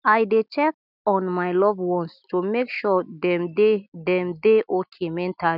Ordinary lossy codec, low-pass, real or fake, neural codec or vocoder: none; 5.4 kHz; real; none